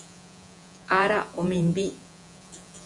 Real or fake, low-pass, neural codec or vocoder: fake; 10.8 kHz; vocoder, 48 kHz, 128 mel bands, Vocos